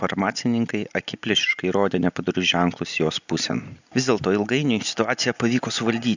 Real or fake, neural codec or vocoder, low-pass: real; none; 7.2 kHz